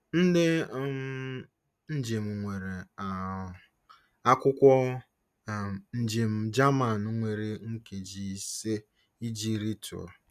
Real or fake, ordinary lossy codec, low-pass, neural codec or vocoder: real; none; 14.4 kHz; none